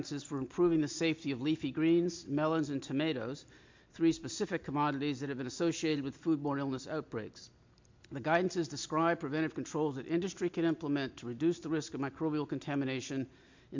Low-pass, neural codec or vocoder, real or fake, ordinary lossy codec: 7.2 kHz; none; real; MP3, 64 kbps